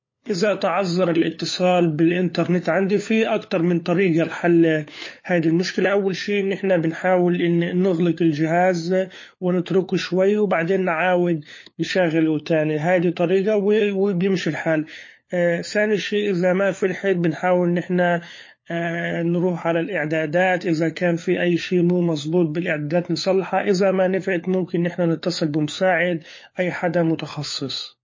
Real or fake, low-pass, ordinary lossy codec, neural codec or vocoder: fake; 7.2 kHz; MP3, 32 kbps; codec, 16 kHz, 4 kbps, FunCodec, trained on LibriTTS, 50 frames a second